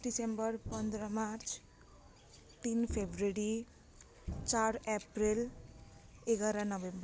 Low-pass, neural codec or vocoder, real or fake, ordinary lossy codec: none; none; real; none